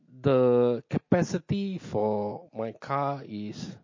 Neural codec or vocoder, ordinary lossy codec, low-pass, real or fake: none; MP3, 32 kbps; 7.2 kHz; real